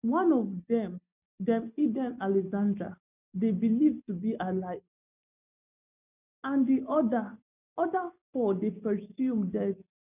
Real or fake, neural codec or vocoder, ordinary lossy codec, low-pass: real; none; none; 3.6 kHz